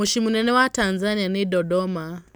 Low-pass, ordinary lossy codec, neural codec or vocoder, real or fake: none; none; none; real